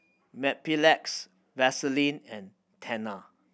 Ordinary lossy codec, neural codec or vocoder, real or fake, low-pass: none; none; real; none